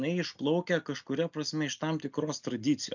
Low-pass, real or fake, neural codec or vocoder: 7.2 kHz; real; none